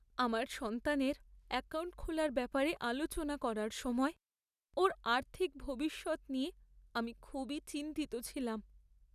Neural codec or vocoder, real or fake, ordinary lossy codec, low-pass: none; real; none; 14.4 kHz